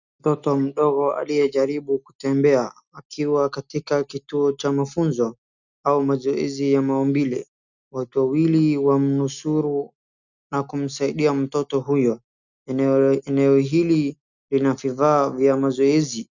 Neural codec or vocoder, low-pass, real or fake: none; 7.2 kHz; real